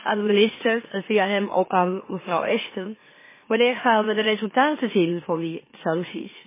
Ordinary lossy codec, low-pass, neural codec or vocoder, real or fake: MP3, 16 kbps; 3.6 kHz; autoencoder, 44.1 kHz, a latent of 192 numbers a frame, MeloTTS; fake